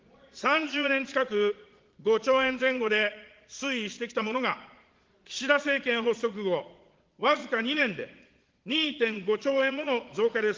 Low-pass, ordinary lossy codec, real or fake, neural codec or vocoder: 7.2 kHz; Opus, 16 kbps; fake; vocoder, 22.05 kHz, 80 mel bands, Vocos